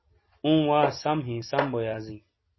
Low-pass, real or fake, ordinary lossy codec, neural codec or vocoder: 7.2 kHz; real; MP3, 24 kbps; none